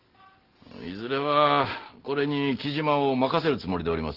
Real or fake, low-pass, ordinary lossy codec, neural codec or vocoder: real; 5.4 kHz; Opus, 16 kbps; none